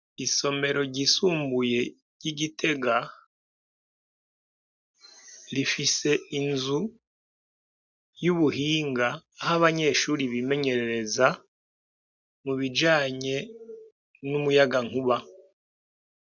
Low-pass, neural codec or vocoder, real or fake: 7.2 kHz; none; real